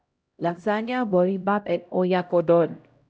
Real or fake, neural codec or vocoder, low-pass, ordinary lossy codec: fake; codec, 16 kHz, 0.5 kbps, X-Codec, HuBERT features, trained on LibriSpeech; none; none